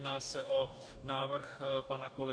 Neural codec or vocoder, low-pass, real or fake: codec, 44.1 kHz, 2.6 kbps, DAC; 9.9 kHz; fake